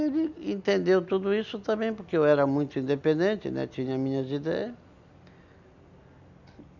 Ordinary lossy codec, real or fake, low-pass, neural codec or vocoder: none; real; 7.2 kHz; none